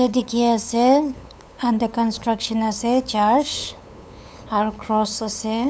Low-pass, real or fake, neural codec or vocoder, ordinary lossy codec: none; fake; codec, 16 kHz, 8 kbps, FunCodec, trained on LibriTTS, 25 frames a second; none